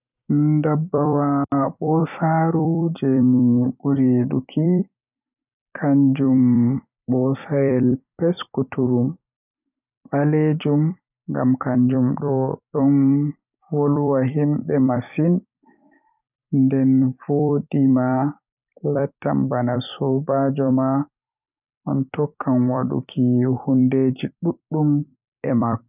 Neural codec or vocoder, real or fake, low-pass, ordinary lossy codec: vocoder, 44.1 kHz, 128 mel bands every 256 samples, BigVGAN v2; fake; 3.6 kHz; none